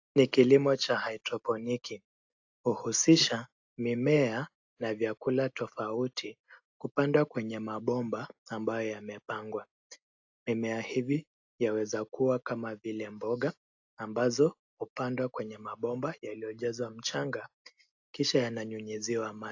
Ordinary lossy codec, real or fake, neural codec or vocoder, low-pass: AAC, 48 kbps; real; none; 7.2 kHz